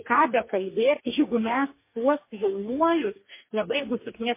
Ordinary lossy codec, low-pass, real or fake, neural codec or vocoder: MP3, 24 kbps; 3.6 kHz; fake; codec, 44.1 kHz, 2.6 kbps, DAC